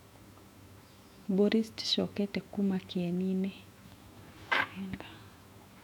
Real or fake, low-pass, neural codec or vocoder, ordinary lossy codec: fake; 19.8 kHz; autoencoder, 48 kHz, 128 numbers a frame, DAC-VAE, trained on Japanese speech; none